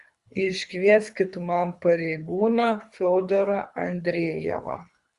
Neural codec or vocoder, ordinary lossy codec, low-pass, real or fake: codec, 24 kHz, 3 kbps, HILCodec; Opus, 64 kbps; 10.8 kHz; fake